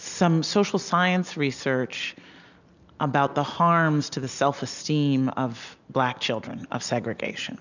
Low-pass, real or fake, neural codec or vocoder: 7.2 kHz; real; none